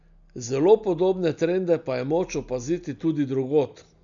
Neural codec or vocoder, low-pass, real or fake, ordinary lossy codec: none; 7.2 kHz; real; none